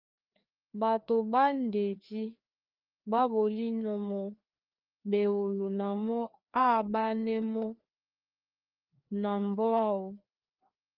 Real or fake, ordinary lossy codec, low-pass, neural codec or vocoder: fake; Opus, 24 kbps; 5.4 kHz; codec, 16 kHz, 2 kbps, FreqCodec, larger model